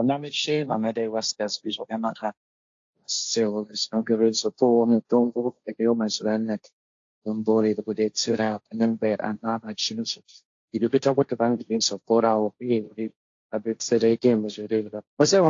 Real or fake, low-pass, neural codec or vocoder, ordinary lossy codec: fake; 7.2 kHz; codec, 16 kHz, 1.1 kbps, Voila-Tokenizer; AAC, 48 kbps